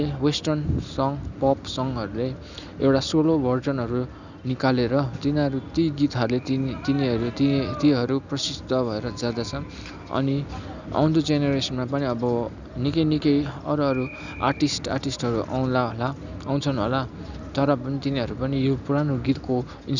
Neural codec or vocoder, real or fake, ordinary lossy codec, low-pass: none; real; none; 7.2 kHz